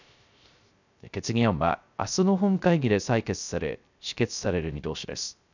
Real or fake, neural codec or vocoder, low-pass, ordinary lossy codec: fake; codec, 16 kHz, 0.3 kbps, FocalCodec; 7.2 kHz; none